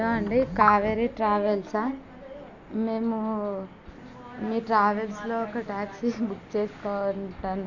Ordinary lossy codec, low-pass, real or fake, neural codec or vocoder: none; 7.2 kHz; real; none